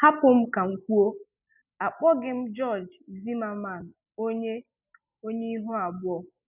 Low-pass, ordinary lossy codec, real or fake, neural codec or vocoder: 3.6 kHz; none; real; none